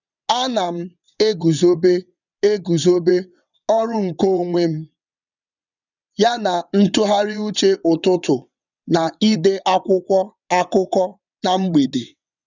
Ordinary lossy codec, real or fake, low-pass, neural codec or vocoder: none; fake; 7.2 kHz; vocoder, 22.05 kHz, 80 mel bands, WaveNeXt